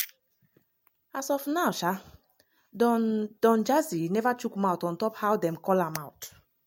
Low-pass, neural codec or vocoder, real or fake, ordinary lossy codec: 19.8 kHz; none; real; MP3, 64 kbps